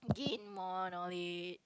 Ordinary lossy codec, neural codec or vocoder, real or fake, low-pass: none; none; real; none